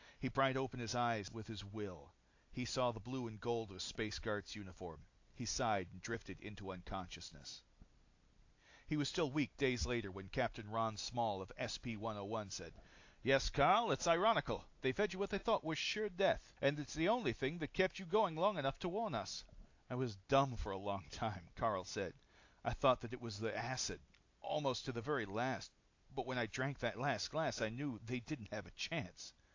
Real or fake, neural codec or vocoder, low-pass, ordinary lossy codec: real; none; 7.2 kHz; AAC, 48 kbps